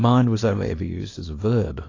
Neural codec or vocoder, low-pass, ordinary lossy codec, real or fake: codec, 24 kHz, 0.9 kbps, WavTokenizer, small release; 7.2 kHz; AAC, 32 kbps; fake